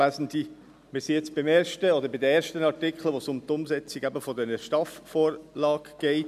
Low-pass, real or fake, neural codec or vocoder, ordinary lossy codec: 14.4 kHz; real; none; none